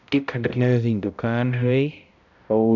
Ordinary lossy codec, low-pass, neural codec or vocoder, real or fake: none; 7.2 kHz; codec, 16 kHz, 0.5 kbps, X-Codec, HuBERT features, trained on balanced general audio; fake